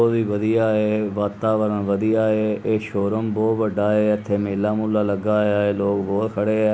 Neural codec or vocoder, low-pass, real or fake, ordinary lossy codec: none; none; real; none